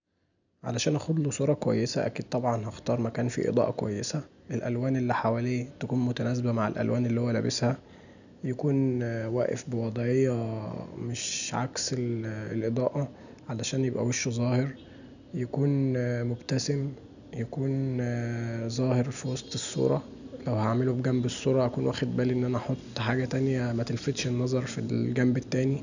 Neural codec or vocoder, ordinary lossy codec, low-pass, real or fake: none; none; 7.2 kHz; real